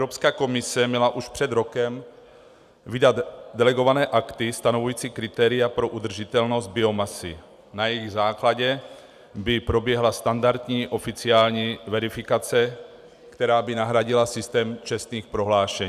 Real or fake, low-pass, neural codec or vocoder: real; 14.4 kHz; none